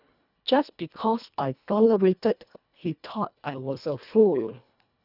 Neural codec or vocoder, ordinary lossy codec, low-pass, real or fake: codec, 24 kHz, 1.5 kbps, HILCodec; none; 5.4 kHz; fake